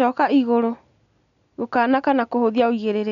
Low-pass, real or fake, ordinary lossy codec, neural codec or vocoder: 7.2 kHz; real; none; none